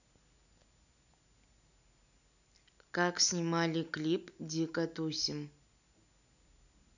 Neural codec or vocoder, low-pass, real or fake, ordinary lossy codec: none; 7.2 kHz; real; none